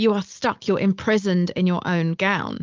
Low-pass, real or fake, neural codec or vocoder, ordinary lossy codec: 7.2 kHz; real; none; Opus, 24 kbps